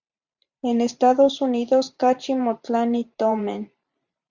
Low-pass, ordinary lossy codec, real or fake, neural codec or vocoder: 7.2 kHz; Opus, 64 kbps; fake; vocoder, 44.1 kHz, 128 mel bands every 512 samples, BigVGAN v2